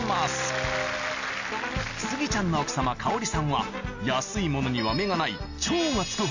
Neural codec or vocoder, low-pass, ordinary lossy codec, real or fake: none; 7.2 kHz; AAC, 48 kbps; real